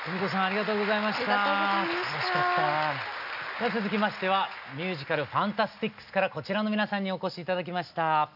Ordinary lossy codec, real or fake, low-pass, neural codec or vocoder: none; real; 5.4 kHz; none